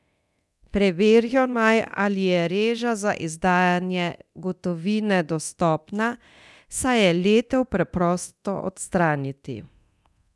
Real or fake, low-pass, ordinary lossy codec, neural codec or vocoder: fake; none; none; codec, 24 kHz, 0.9 kbps, DualCodec